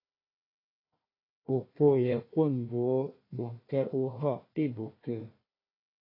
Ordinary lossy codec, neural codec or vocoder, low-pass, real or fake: AAC, 24 kbps; codec, 16 kHz, 1 kbps, FunCodec, trained on Chinese and English, 50 frames a second; 5.4 kHz; fake